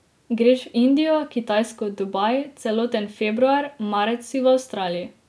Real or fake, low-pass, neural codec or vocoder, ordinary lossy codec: real; none; none; none